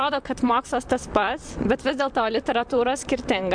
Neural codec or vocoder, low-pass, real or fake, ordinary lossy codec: vocoder, 44.1 kHz, 128 mel bands, Pupu-Vocoder; 9.9 kHz; fake; MP3, 64 kbps